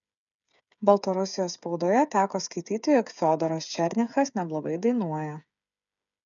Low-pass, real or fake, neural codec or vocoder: 7.2 kHz; fake; codec, 16 kHz, 8 kbps, FreqCodec, smaller model